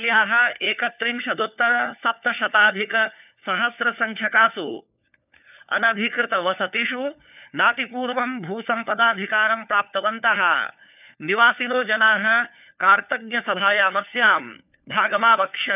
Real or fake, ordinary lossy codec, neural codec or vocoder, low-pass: fake; none; codec, 16 kHz, 4 kbps, FunCodec, trained on LibriTTS, 50 frames a second; 3.6 kHz